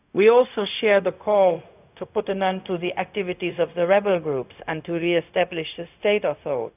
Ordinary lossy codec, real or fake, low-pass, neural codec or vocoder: none; fake; 3.6 kHz; codec, 16 kHz, 0.4 kbps, LongCat-Audio-Codec